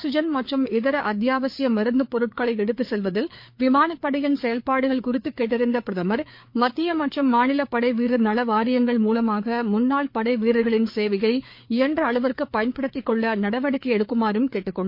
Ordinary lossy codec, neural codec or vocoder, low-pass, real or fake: MP3, 32 kbps; codec, 16 kHz, 4 kbps, FreqCodec, larger model; 5.4 kHz; fake